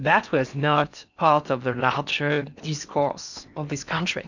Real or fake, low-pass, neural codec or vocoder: fake; 7.2 kHz; codec, 16 kHz in and 24 kHz out, 0.8 kbps, FocalCodec, streaming, 65536 codes